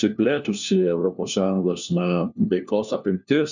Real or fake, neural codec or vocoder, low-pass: fake; codec, 16 kHz, 1 kbps, FunCodec, trained on LibriTTS, 50 frames a second; 7.2 kHz